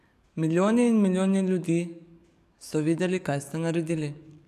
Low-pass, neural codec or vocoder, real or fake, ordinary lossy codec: 14.4 kHz; codec, 44.1 kHz, 7.8 kbps, DAC; fake; none